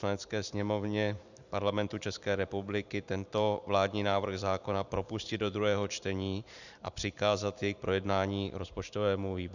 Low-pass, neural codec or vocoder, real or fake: 7.2 kHz; vocoder, 44.1 kHz, 128 mel bands every 512 samples, BigVGAN v2; fake